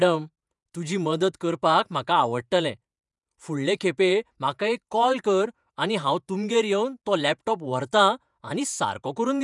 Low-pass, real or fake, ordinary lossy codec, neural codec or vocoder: 10.8 kHz; fake; none; vocoder, 48 kHz, 128 mel bands, Vocos